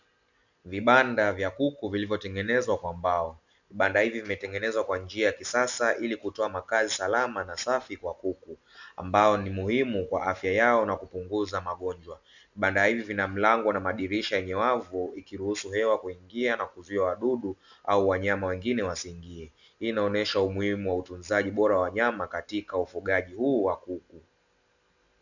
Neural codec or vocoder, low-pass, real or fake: none; 7.2 kHz; real